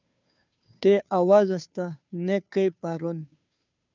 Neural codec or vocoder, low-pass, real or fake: codec, 16 kHz, 2 kbps, FunCodec, trained on Chinese and English, 25 frames a second; 7.2 kHz; fake